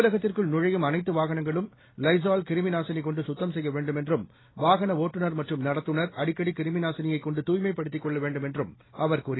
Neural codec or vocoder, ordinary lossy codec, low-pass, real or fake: none; AAC, 16 kbps; 7.2 kHz; real